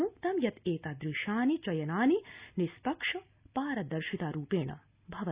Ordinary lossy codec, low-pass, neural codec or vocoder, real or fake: Opus, 64 kbps; 3.6 kHz; none; real